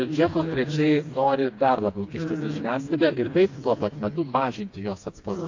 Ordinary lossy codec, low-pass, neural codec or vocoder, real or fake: AAC, 48 kbps; 7.2 kHz; codec, 16 kHz, 2 kbps, FreqCodec, smaller model; fake